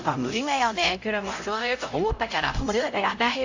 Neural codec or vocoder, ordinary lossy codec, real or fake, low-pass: codec, 16 kHz, 0.5 kbps, X-Codec, HuBERT features, trained on LibriSpeech; AAC, 48 kbps; fake; 7.2 kHz